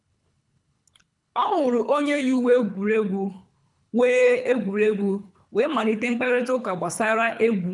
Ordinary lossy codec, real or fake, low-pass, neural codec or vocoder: none; fake; 10.8 kHz; codec, 24 kHz, 3 kbps, HILCodec